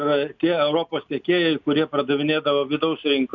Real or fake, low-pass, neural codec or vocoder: real; 7.2 kHz; none